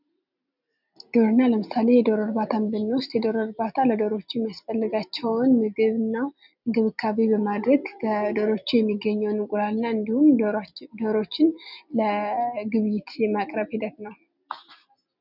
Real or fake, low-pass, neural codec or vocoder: real; 5.4 kHz; none